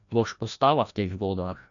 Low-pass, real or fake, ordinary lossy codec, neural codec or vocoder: 7.2 kHz; fake; none; codec, 16 kHz, 1 kbps, FreqCodec, larger model